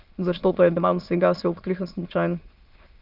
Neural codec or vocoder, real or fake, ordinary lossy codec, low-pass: autoencoder, 22.05 kHz, a latent of 192 numbers a frame, VITS, trained on many speakers; fake; Opus, 32 kbps; 5.4 kHz